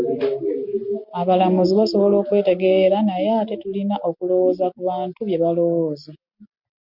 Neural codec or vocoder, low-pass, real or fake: none; 5.4 kHz; real